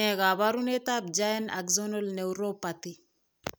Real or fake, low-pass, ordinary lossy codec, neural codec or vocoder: real; none; none; none